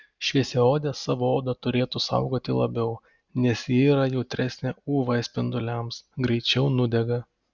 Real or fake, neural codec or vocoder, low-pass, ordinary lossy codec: real; none; 7.2 kHz; Opus, 64 kbps